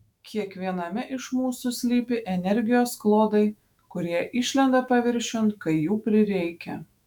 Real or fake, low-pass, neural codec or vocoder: fake; 19.8 kHz; autoencoder, 48 kHz, 128 numbers a frame, DAC-VAE, trained on Japanese speech